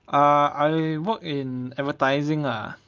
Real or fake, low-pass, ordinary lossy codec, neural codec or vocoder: real; 7.2 kHz; Opus, 24 kbps; none